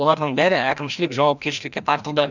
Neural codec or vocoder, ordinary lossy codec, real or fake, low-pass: codec, 16 kHz, 1 kbps, FreqCodec, larger model; none; fake; 7.2 kHz